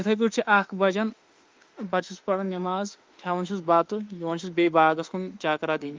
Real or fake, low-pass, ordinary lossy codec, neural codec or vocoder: fake; 7.2 kHz; Opus, 32 kbps; autoencoder, 48 kHz, 32 numbers a frame, DAC-VAE, trained on Japanese speech